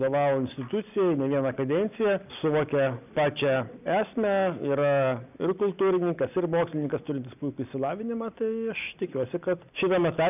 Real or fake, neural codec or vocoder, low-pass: real; none; 3.6 kHz